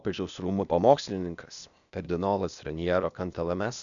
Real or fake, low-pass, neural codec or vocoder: fake; 7.2 kHz; codec, 16 kHz, 0.8 kbps, ZipCodec